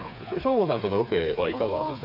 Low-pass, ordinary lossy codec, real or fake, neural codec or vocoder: 5.4 kHz; none; fake; codec, 16 kHz, 4 kbps, FreqCodec, smaller model